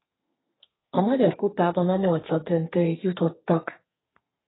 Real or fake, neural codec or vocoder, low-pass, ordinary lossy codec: fake; codec, 32 kHz, 1.9 kbps, SNAC; 7.2 kHz; AAC, 16 kbps